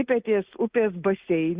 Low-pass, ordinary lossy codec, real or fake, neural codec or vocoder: 3.6 kHz; Opus, 64 kbps; real; none